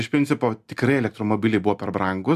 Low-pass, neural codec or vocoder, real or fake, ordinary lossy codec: 14.4 kHz; none; real; AAC, 96 kbps